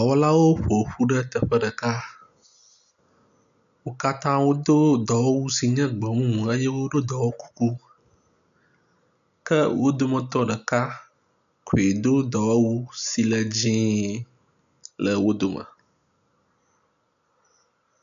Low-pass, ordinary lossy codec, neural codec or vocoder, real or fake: 7.2 kHz; AAC, 96 kbps; none; real